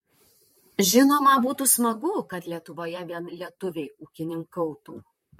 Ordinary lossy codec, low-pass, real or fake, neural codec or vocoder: MP3, 64 kbps; 19.8 kHz; fake; vocoder, 44.1 kHz, 128 mel bands, Pupu-Vocoder